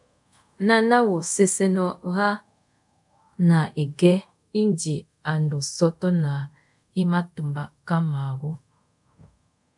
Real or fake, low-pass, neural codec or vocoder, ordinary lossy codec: fake; 10.8 kHz; codec, 24 kHz, 0.5 kbps, DualCodec; MP3, 96 kbps